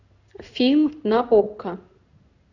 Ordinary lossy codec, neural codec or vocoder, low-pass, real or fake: Opus, 64 kbps; codec, 16 kHz in and 24 kHz out, 1 kbps, XY-Tokenizer; 7.2 kHz; fake